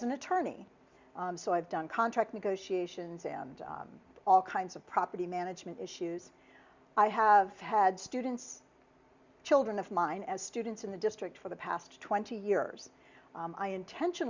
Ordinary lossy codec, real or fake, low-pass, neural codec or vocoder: Opus, 64 kbps; real; 7.2 kHz; none